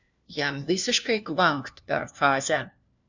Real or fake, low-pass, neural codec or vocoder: fake; 7.2 kHz; codec, 16 kHz, 1 kbps, FunCodec, trained on LibriTTS, 50 frames a second